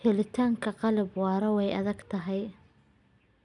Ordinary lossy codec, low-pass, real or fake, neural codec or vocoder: none; 10.8 kHz; real; none